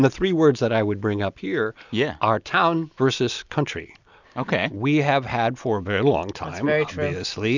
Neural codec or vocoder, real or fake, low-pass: none; real; 7.2 kHz